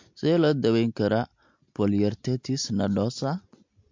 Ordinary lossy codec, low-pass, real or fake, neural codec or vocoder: MP3, 48 kbps; 7.2 kHz; real; none